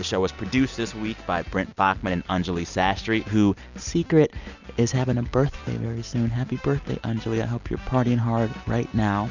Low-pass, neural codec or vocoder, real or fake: 7.2 kHz; none; real